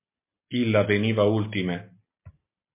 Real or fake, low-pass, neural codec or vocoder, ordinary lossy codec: real; 3.6 kHz; none; MP3, 24 kbps